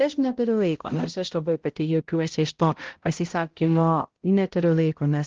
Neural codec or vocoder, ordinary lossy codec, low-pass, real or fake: codec, 16 kHz, 0.5 kbps, X-Codec, HuBERT features, trained on balanced general audio; Opus, 16 kbps; 7.2 kHz; fake